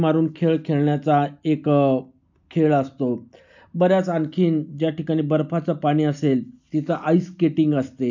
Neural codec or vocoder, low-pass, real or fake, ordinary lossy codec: none; 7.2 kHz; real; none